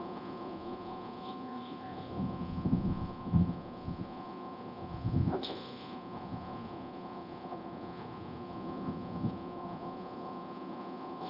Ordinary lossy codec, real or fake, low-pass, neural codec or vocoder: Opus, 64 kbps; fake; 5.4 kHz; codec, 24 kHz, 0.9 kbps, DualCodec